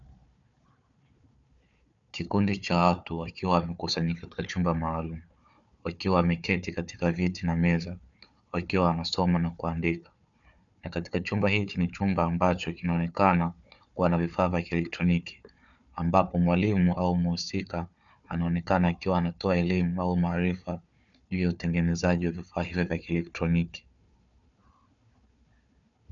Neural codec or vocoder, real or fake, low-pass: codec, 16 kHz, 4 kbps, FunCodec, trained on Chinese and English, 50 frames a second; fake; 7.2 kHz